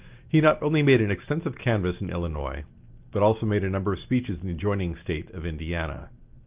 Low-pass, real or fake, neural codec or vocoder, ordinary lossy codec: 3.6 kHz; real; none; Opus, 24 kbps